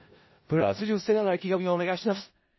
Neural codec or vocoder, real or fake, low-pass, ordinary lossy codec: codec, 16 kHz in and 24 kHz out, 0.4 kbps, LongCat-Audio-Codec, four codebook decoder; fake; 7.2 kHz; MP3, 24 kbps